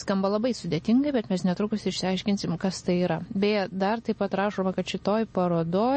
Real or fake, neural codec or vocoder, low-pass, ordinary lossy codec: real; none; 10.8 kHz; MP3, 32 kbps